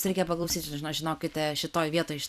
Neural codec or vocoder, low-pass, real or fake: vocoder, 44.1 kHz, 128 mel bands every 256 samples, BigVGAN v2; 14.4 kHz; fake